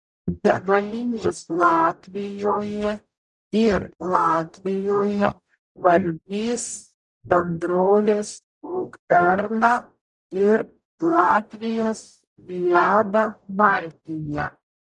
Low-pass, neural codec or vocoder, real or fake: 10.8 kHz; codec, 44.1 kHz, 0.9 kbps, DAC; fake